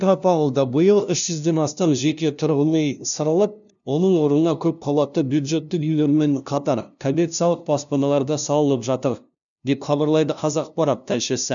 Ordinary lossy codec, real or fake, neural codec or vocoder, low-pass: none; fake; codec, 16 kHz, 0.5 kbps, FunCodec, trained on LibriTTS, 25 frames a second; 7.2 kHz